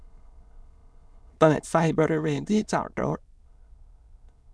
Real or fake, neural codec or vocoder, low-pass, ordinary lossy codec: fake; autoencoder, 22.05 kHz, a latent of 192 numbers a frame, VITS, trained on many speakers; none; none